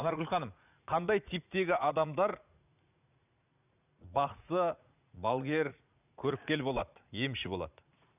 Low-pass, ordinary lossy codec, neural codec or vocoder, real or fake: 3.6 kHz; none; vocoder, 44.1 kHz, 80 mel bands, Vocos; fake